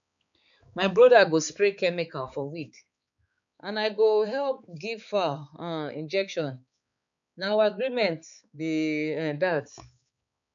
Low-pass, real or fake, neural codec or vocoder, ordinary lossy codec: 7.2 kHz; fake; codec, 16 kHz, 4 kbps, X-Codec, HuBERT features, trained on balanced general audio; none